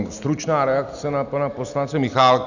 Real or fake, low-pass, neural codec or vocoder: real; 7.2 kHz; none